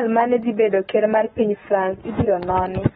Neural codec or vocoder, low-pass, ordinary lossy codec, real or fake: codec, 44.1 kHz, 7.8 kbps, Pupu-Codec; 19.8 kHz; AAC, 16 kbps; fake